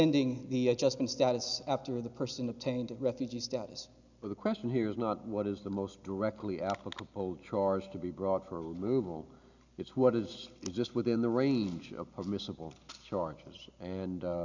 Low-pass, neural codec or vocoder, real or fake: 7.2 kHz; none; real